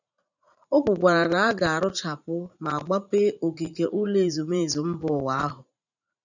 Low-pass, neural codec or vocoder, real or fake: 7.2 kHz; vocoder, 44.1 kHz, 80 mel bands, Vocos; fake